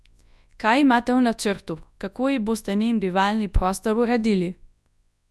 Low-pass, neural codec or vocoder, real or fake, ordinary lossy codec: none; codec, 24 kHz, 0.9 kbps, WavTokenizer, large speech release; fake; none